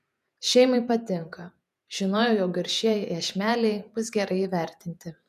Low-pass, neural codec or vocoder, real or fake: 14.4 kHz; vocoder, 48 kHz, 128 mel bands, Vocos; fake